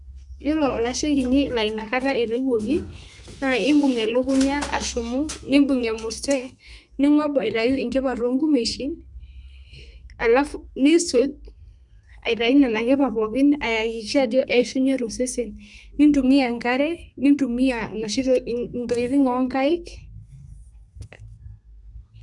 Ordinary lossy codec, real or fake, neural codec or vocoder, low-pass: none; fake; codec, 32 kHz, 1.9 kbps, SNAC; 10.8 kHz